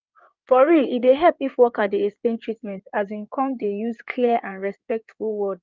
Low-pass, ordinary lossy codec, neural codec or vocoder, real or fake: 7.2 kHz; Opus, 24 kbps; codec, 16 kHz in and 24 kHz out, 2.2 kbps, FireRedTTS-2 codec; fake